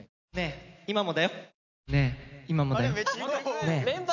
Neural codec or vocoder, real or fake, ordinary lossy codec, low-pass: none; real; none; 7.2 kHz